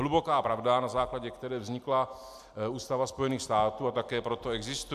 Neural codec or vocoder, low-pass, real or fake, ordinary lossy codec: none; 14.4 kHz; real; MP3, 96 kbps